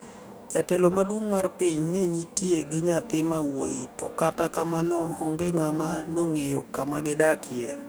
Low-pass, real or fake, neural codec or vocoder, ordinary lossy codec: none; fake; codec, 44.1 kHz, 2.6 kbps, DAC; none